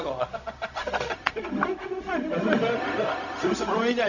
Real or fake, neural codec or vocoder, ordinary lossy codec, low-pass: fake; codec, 16 kHz, 0.4 kbps, LongCat-Audio-Codec; none; 7.2 kHz